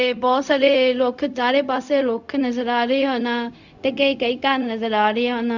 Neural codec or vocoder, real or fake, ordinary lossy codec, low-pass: codec, 16 kHz, 0.4 kbps, LongCat-Audio-Codec; fake; none; 7.2 kHz